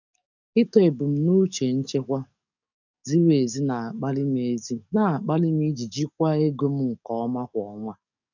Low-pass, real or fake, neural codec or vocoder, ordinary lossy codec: 7.2 kHz; fake; codec, 44.1 kHz, 7.8 kbps, DAC; none